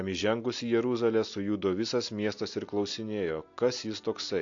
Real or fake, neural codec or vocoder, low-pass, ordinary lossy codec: real; none; 7.2 kHz; AAC, 48 kbps